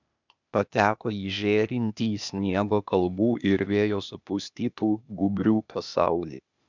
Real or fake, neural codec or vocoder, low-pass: fake; codec, 16 kHz, 0.8 kbps, ZipCodec; 7.2 kHz